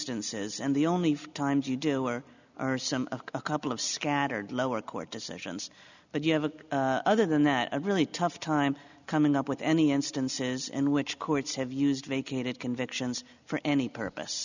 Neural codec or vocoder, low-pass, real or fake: none; 7.2 kHz; real